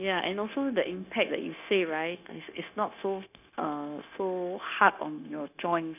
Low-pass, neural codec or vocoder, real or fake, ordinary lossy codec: 3.6 kHz; codec, 16 kHz, 0.9 kbps, LongCat-Audio-Codec; fake; none